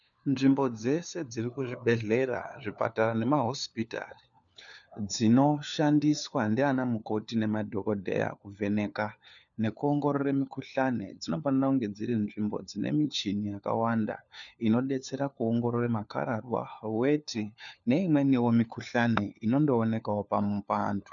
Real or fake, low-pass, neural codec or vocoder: fake; 7.2 kHz; codec, 16 kHz, 4 kbps, FunCodec, trained on LibriTTS, 50 frames a second